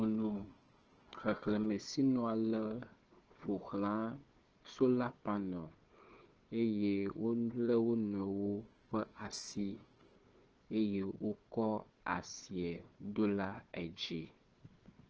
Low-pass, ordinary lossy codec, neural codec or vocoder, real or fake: 7.2 kHz; Opus, 16 kbps; codec, 16 kHz, 4 kbps, FunCodec, trained on Chinese and English, 50 frames a second; fake